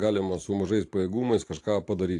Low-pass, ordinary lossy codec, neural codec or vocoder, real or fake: 10.8 kHz; AAC, 48 kbps; vocoder, 44.1 kHz, 128 mel bands every 512 samples, BigVGAN v2; fake